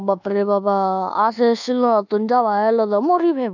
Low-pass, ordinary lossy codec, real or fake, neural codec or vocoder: 7.2 kHz; none; fake; codec, 24 kHz, 1.2 kbps, DualCodec